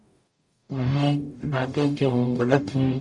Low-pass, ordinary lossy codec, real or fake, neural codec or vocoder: 10.8 kHz; Opus, 64 kbps; fake; codec, 44.1 kHz, 0.9 kbps, DAC